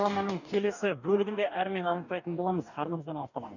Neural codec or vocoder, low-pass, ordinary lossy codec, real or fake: codec, 44.1 kHz, 2.6 kbps, DAC; 7.2 kHz; none; fake